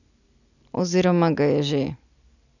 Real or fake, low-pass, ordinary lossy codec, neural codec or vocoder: real; 7.2 kHz; none; none